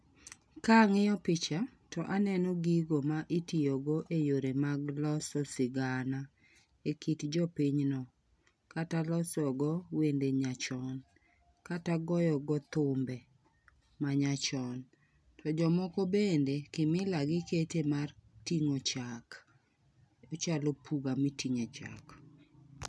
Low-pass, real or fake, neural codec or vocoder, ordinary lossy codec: none; real; none; none